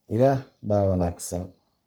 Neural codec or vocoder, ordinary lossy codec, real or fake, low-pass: codec, 44.1 kHz, 3.4 kbps, Pupu-Codec; none; fake; none